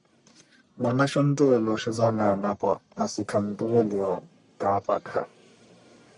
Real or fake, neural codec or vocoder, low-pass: fake; codec, 44.1 kHz, 1.7 kbps, Pupu-Codec; 10.8 kHz